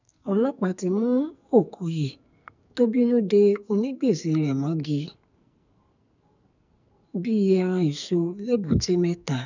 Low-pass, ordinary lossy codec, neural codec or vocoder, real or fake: 7.2 kHz; none; codec, 44.1 kHz, 2.6 kbps, SNAC; fake